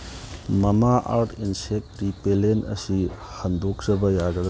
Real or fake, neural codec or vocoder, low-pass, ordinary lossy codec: real; none; none; none